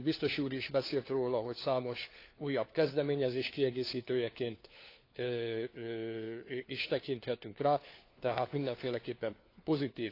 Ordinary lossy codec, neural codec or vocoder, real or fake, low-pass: AAC, 32 kbps; codec, 16 kHz, 4 kbps, FunCodec, trained on LibriTTS, 50 frames a second; fake; 5.4 kHz